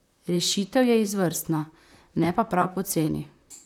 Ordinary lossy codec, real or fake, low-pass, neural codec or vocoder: none; fake; 19.8 kHz; vocoder, 44.1 kHz, 128 mel bands, Pupu-Vocoder